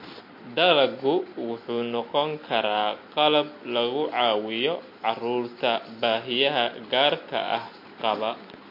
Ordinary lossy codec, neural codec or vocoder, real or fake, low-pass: MP3, 32 kbps; none; real; 5.4 kHz